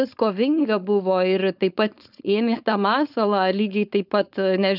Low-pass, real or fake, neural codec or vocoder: 5.4 kHz; fake; codec, 16 kHz, 4.8 kbps, FACodec